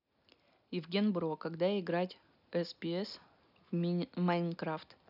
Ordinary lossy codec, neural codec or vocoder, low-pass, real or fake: none; none; 5.4 kHz; real